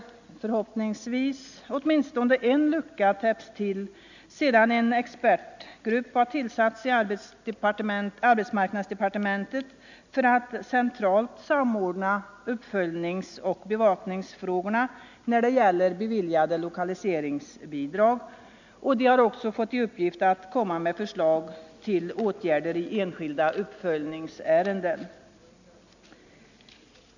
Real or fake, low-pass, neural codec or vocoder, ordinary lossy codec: real; 7.2 kHz; none; none